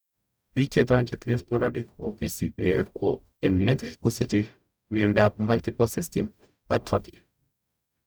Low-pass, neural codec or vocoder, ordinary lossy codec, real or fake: none; codec, 44.1 kHz, 0.9 kbps, DAC; none; fake